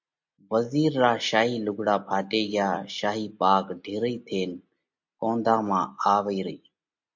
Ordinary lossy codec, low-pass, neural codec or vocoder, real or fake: MP3, 48 kbps; 7.2 kHz; none; real